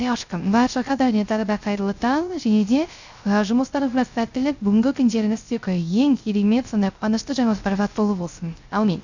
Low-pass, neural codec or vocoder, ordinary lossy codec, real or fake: 7.2 kHz; codec, 16 kHz, 0.3 kbps, FocalCodec; none; fake